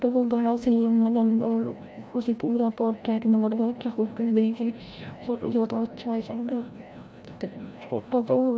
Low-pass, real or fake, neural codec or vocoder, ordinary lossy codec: none; fake; codec, 16 kHz, 0.5 kbps, FreqCodec, larger model; none